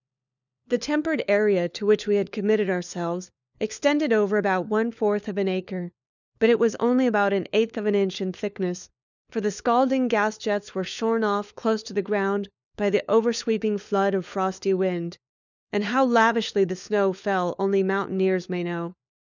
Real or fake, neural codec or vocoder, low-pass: fake; codec, 16 kHz, 4 kbps, FunCodec, trained on LibriTTS, 50 frames a second; 7.2 kHz